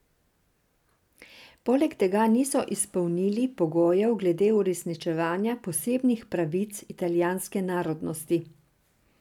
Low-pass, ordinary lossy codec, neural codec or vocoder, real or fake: 19.8 kHz; none; none; real